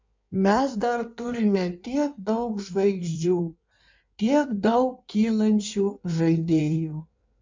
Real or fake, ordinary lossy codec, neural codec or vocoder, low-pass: fake; MP3, 64 kbps; codec, 16 kHz in and 24 kHz out, 1.1 kbps, FireRedTTS-2 codec; 7.2 kHz